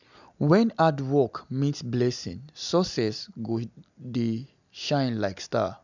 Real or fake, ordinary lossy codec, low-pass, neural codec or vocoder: real; none; 7.2 kHz; none